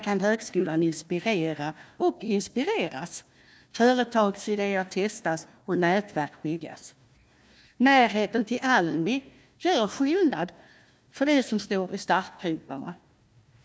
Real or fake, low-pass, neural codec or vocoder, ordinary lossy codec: fake; none; codec, 16 kHz, 1 kbps, FunCodec, trained on Chinese and English, 50 frames a second; none